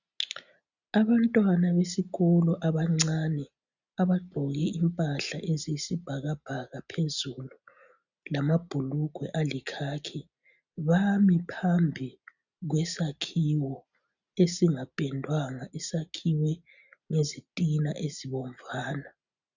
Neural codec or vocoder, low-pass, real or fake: none; 7.2 kHz; real